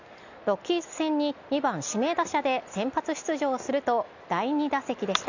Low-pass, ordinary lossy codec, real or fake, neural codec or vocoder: 7.2 kHz; none; real; none